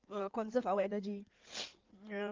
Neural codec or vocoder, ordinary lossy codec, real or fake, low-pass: codec, 16 kHz in and 24 kHz out, 2.2 kbps, FireRedTTS-2 codec; Opus, 24 kbps; fake; 7.2 kHz